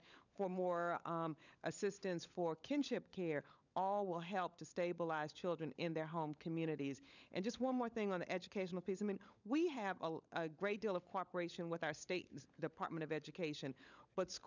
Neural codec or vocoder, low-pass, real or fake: codec, 16 kHz, 16 kbps, FunCodec, trained on LibriTTS, 50 frames a second; 7.2 kHz; fake